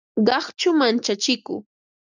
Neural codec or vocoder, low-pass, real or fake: none; 7.2 kHz; real